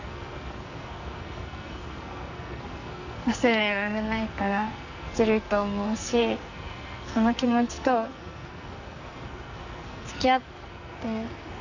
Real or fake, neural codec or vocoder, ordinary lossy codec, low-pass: fake; codec, 44.1 kHz, 2.6 kbps, SNAC; none; 7.2 kHz